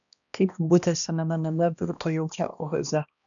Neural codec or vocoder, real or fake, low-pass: codec, 16 kHz, 1 kbps, X-Codec, HuBERT features, trained on balanced general audio; fake; 7.2 kHz